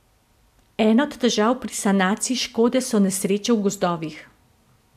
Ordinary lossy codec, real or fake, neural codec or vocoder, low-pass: AAC, 96 kbps; real; none; 14.4 kHz